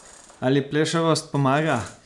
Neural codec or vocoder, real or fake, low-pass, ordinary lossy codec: none; real; 10.8 kHz; none